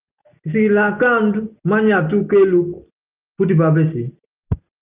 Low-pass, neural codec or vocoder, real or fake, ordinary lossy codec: 3.6 kHz; none; real; Opus, 24 kbps